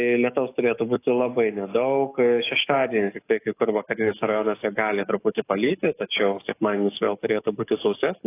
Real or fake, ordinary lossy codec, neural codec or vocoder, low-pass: fake; AAC, 24 kbps; codec, 44.1 kHz, 7.8 kbps, DAC; 3.6 kHz